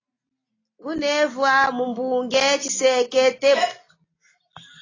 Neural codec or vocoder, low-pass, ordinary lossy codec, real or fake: none; 7.2 kHz; AAC, 32 kbps; real